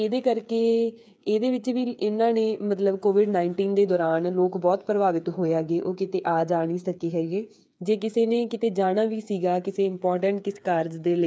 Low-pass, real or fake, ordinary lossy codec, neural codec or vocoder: none; fake; none; codec, 16 kHz, 8 kbps, FreqCodec, smaller model